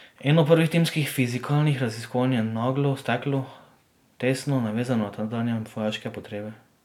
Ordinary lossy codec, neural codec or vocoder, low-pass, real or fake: none; none; 19.8 kHz; real